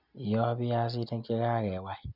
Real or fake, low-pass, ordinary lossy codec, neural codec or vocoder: real; 5.4 kHz; none; none